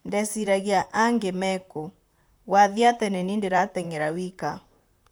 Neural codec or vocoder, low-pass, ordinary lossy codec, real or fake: vocoder, 44.1 kHz, 128 mel bands, Pupu-Vocoder; none; none; fake